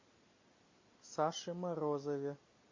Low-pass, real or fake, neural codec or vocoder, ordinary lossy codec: 7.2 kHz; real; none; MP3, 32 kbps